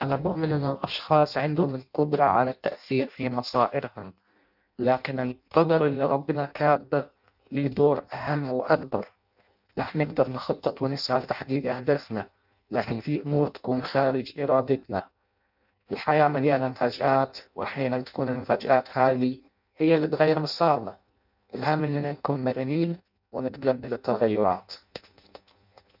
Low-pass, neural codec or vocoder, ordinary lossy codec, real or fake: 5.4 kHz; codec, 16 kHz in and 24 kHz out, 0.6 kbps, FireRedTTS-2 codec; none; fake